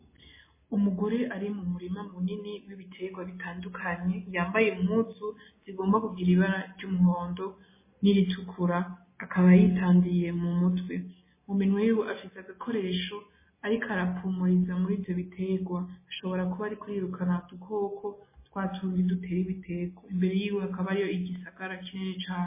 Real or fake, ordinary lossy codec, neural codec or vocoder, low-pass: real; MP3, 16 kbps; none; 3.6 kHz